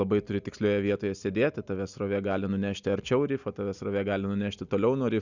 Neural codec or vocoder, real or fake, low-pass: none; real; 7.2 kHz